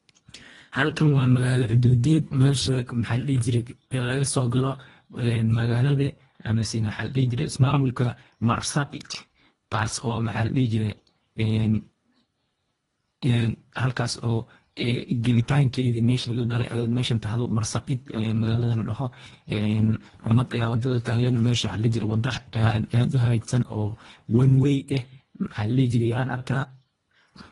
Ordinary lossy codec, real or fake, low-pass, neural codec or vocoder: AAC, 32 kbps; fake; 10.8 kHz; codec, 24 kHz, 1.5 kbps, HILCodec